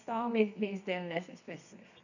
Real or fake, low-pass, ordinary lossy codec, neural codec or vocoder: fake; 7.2 kHz; none; codec, 24 kHz, 0.9 kbps, WavTokenizer, medium music audio release